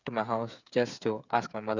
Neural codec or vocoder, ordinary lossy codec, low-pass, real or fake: codec, 16 kHz, 8 kbps, FreqCodec, smaller model; Opus, 64 kbps; 7.2 kHz; fake